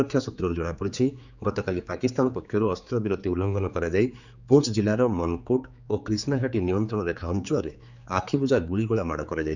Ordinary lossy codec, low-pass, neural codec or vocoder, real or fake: none; 7.2 kHz; codec, 16 kHz, 4 kbps, X-Codec, HuBERT features, trained on general audio; fake